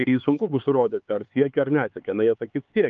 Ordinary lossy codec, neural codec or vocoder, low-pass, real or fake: Opus, 24 kbps; codec, 16 kHz, 4 kbps, X-Codec, HuBERT features, trained on LibriSpeech; 7.2 kHz; fake